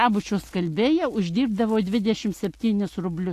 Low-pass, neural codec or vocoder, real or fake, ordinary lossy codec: 14.4 kHz; none; real; AAC, 64 kbps